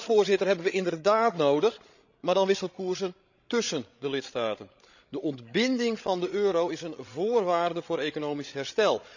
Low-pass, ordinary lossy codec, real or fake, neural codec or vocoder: 7.2 kHz; none; fake; codec, 16 kHz, 16 kbps, FreqCodec, larger model